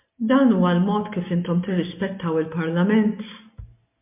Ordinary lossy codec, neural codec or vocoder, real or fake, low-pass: MP3, 32 kbps; none; real; 3.6 kHz